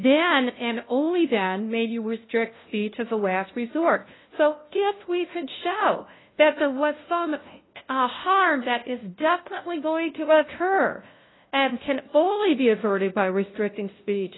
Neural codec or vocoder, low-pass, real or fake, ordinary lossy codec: codec, 16 kHz, 0.5 kbps, FunCodec, trained on LibriTTS, 25 frames a second; 7.2 kHz; fake; AAC, 16 kbps